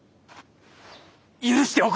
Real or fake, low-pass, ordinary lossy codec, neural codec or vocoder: real; none; none; none